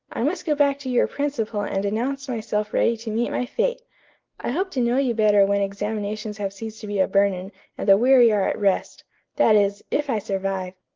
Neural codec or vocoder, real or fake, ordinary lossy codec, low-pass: none; real; Opus, 32 kbps; 7.2 kHz